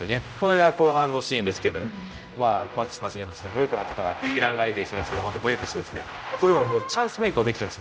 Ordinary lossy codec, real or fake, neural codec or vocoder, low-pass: none; fake; codec, 16 kHz, 0.5 kbps, X-Codec, HuBERT features, trained on general audio; none